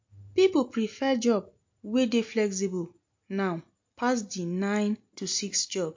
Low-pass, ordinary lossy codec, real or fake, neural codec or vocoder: 7.2 kHz; MP3, 48 kbps; real; none